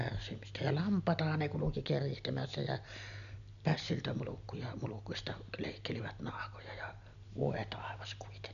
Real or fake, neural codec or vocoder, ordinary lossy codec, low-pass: real; none; none; 7.2 kHz